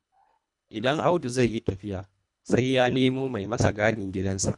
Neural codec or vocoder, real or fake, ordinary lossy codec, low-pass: codec, 24 kHz, 1.5 kbps, HILCodec; fake; none; 10.8 kHz